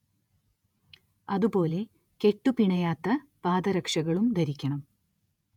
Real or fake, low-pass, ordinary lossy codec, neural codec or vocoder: fake; 19.8 kHz; none; vocoder, 48 kHz, 128 mel bands, Vocos